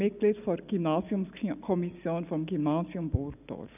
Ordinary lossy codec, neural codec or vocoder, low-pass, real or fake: none; none; 3.6 kHz; real